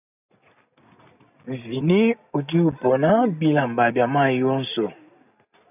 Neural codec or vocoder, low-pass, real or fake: none; 3.6 kHz; real